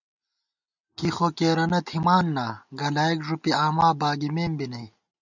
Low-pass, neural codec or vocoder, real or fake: 7.2 kHz; none; real